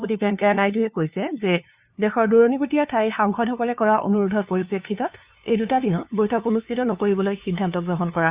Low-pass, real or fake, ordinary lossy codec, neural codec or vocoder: 3.6 kHz; fake; Opus, 64 kbps; codec, 16 kHz, 2 kbps, FunCodec, trained on LibriTTS, 25 frames a second